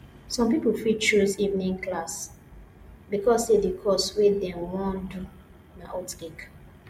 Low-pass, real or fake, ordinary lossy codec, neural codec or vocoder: 19.8 kHz; real; MP3, 64 kbps; none